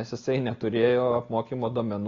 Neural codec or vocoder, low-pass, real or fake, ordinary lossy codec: none; 7.2 kHz; real; AAC, 32 kbps